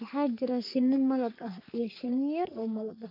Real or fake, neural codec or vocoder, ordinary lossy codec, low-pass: fake; codec, 44.1 kHz, 3.4 kbps, Pupu-Codec; AAC, 32 kbps; 5.4 kHz